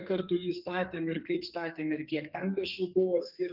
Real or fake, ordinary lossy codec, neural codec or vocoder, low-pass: fake; Opus, 32 kbps; codec, 32 kHz, 1.9 kbps, SNAC; 5.4 kHz